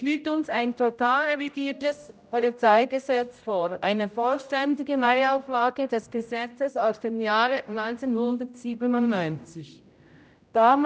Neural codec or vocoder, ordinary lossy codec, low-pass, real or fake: codec, 16 kHz, 0.5 kbps, X-Codec, HuBERT features, trained on general audio; none; none; fake